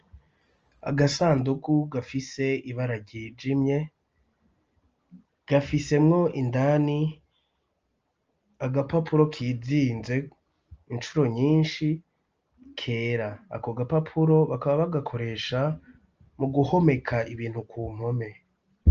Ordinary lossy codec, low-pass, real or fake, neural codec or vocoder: Opus, 24 kbps; 7.2 kHz; real; none